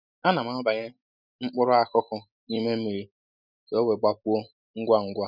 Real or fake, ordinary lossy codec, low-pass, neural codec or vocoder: real; none; 5.4 kHz; none